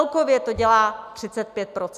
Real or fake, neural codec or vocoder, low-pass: real; none; 14.4 kHz